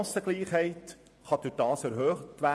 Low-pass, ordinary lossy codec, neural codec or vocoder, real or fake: none; none; vocoder, 24 kHz, 100 mel bands, Vocos; fake